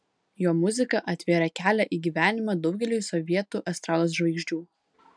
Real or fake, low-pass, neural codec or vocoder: real; 9.9 kHz; none